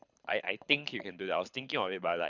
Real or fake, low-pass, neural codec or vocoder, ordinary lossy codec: fake; 7.2 kHz; codec, 24 kHz, 6 kbps, HILCodec; none